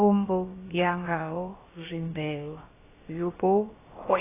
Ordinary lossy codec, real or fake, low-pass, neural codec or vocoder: AAC, 16 kbps; fake; 3.6 kHz; codec, 16 kHz, about 1 kbps, DyCAST, with the encoder's durations